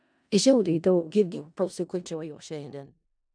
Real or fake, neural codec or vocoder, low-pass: fake; codec, 16 kHz in and 24 kHz out, 0.4 kbps, LongCat-Audio-Codec, four codebook decoder; 9.9 kHz